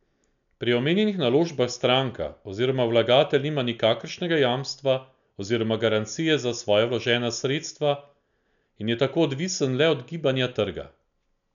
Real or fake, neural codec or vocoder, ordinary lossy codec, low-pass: real; none; none; 7.2 kHz